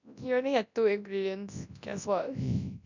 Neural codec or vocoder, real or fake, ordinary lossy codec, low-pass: codec, 24 kHz, 0.9 kbps, WavTokenizer, large speech release; fake; none; 7.2 kHz